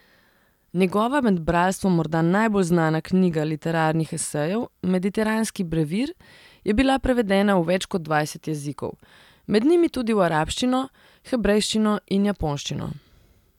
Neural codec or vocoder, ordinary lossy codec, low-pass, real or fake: none; none; 19.8 kHz; real